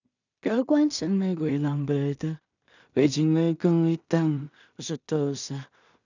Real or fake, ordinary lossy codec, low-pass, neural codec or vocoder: fake; none; 7.2 kHz; codec, 16 kHz in and 24 kHz out, 0.4 kbps, LongCat-Audio-Codec, two codebook decoder